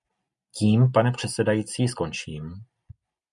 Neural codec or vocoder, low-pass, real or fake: none; 10.8 kHz; real